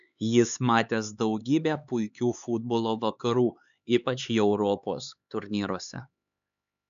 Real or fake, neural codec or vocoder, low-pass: fake; codec, 16 kHz, 4 kbps, X-Codec, HuBERT features, trained on LibriSpeech; 7.2 kHz